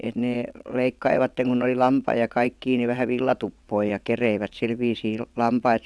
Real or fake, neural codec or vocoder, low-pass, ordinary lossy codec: fake; vocoder, 22.05 kHz, 80 mel bands, WaveNeXt; none; none